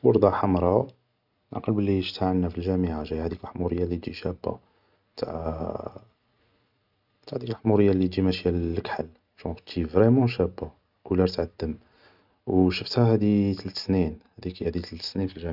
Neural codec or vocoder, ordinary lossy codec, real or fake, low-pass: none; none; real; 5.4 kHz